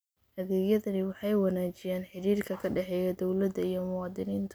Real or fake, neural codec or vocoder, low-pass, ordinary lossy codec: real; none; none; none